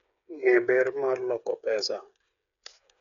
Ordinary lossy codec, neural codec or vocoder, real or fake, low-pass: none; codec, 16 kHz, 8 kbps, FreqCodec, smaller model; fake; 7.2 kHz